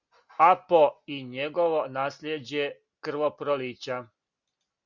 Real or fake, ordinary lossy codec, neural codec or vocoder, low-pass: real; Opus, 64 kbps; none; 7.2 kHz